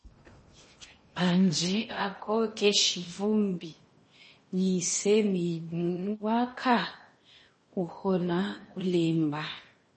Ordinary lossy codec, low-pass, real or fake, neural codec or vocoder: MP3, 32 kbps; 10.8 kHz; fake; codec, 16 kHz in and 24 kHz out, 0.8 kbps, FocalCodec, streaming, 65536 codes